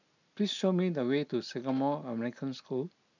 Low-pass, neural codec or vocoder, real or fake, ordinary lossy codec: 7.2 kHz; none; real; none